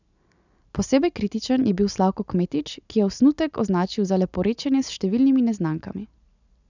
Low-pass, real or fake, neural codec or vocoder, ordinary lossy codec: 7.2 kHz; real; none; none